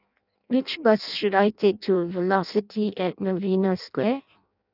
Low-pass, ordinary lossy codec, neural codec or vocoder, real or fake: 5.4 kHz; none; codec, 16 kHz in and 24 kHz out, 0.6 kbps, FireRedTTS-2 codec; fake